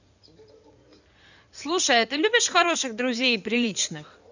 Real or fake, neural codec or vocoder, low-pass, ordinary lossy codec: fake; codec, 16 kHz in and 24 kHz out, 2.2 kbps, FireRedTTS-2 codec; 7.2 kHz; none